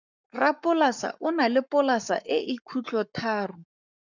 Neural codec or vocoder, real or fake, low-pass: codec, 44.1 kHz, 7.8 kbps, Pupu-Codec; fake; 7.2 kHz